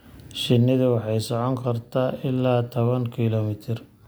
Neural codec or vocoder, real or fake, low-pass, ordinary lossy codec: none; real; none; none